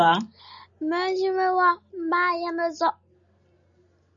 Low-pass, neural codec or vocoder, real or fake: 7.2 kHz; none; real